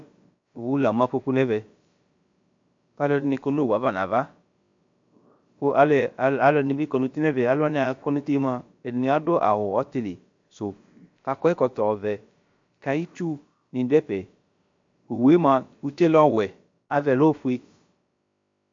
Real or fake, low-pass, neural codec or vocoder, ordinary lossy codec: fake; 7.2 kHz; codec, 16 kHz, about 1 kbps, DyCAST, with the encoder's durations; MP3, 48 kbps